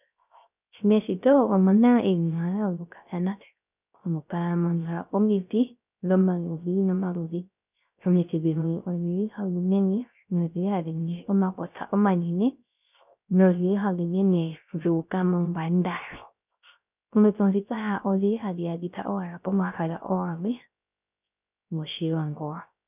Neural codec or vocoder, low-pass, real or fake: codec, 16 kHz, 0.3 kbps, FocalCodec; 3.6 kHz; fake